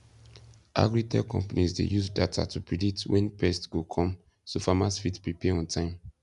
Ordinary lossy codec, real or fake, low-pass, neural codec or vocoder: none; real; 10.8 kHz; none